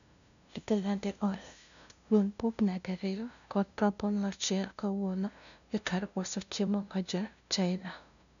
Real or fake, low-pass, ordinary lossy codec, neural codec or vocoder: fake; 7.2 kHz; none; codec, 16 kHz, 0.5 kbps, FunCodec, trained on LibriTTS, 25 frames a second